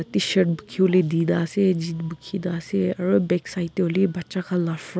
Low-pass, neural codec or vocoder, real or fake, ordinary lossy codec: none; none; real; none